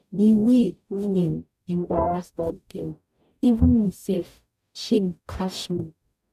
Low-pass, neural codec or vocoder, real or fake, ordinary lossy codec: 14.4 kHz; codec, 44.1 kHz, 0.9 kbps, DAC; fake; none